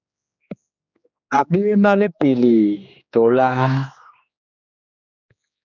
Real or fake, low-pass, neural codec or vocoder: fake; 7.2 kHz; codec, 16 kHz, 2 kbps, X-Codec, HuBERT features, trained on general audio